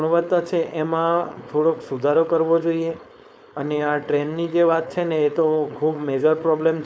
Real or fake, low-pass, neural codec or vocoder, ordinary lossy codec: fake; none; codec, 16 kHz, 4.8 kbps, FACodec; none